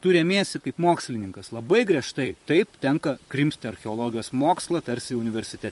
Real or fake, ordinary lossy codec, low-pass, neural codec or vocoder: fake; MP3, 48 kbps; 14.4 kHz; vocoder, 44.1 kHz, 128 mel bands, Pupu-Vocoder